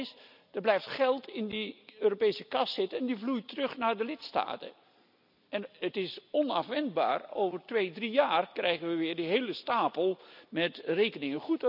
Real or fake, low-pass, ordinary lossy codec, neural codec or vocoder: real; 5.4 kHz; none; none